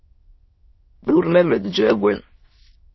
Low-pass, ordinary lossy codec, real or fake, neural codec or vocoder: 7.2 kHz; MP3, 24 kbps; fake; autoencoder, 22.05 kHz, a latent of 192 numbers a frame, VITS, trained on many speakers